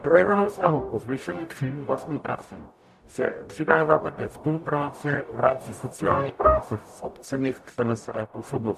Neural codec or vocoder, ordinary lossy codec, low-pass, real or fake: codec, 44.1 kHz, 0.9 kbps, DAC; AAC, 96 kbps; 14.4 kHz; fake